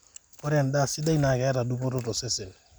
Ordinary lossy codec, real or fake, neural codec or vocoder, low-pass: none; real; none; none